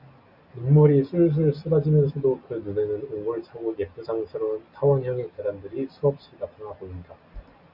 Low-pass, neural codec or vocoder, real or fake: 5.4 kHz; none; real